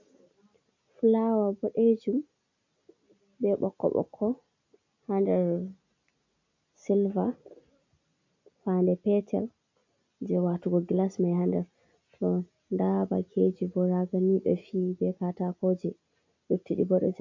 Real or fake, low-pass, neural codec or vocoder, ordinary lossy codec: real; 7.2 kHz; none; MP3, 48 kbps